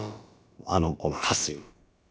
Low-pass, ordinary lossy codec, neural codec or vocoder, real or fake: none; none; codec, 16 kHz, about 1 kbps, DyCAST, with the encoder's durations; fake